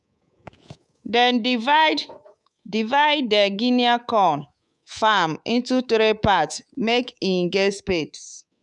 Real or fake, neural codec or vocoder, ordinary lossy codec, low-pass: fake; codec, 24 kHz, 3.1 kbps, DualCodec; none; 10.8 kHz